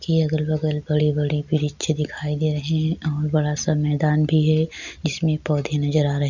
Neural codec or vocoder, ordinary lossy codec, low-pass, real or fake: none; none; 7.2 kHz; real